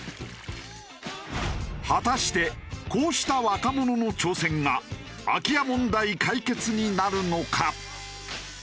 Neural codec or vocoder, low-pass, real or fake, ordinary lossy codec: none; none; real; none